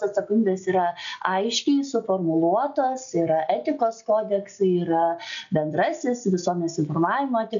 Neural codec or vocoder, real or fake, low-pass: none; real; 7.2 kHz